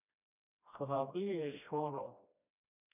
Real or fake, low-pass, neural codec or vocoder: fake; 3.6 kHz; codec, 16 kHz, 1 kbps, FreqCodec, smaller model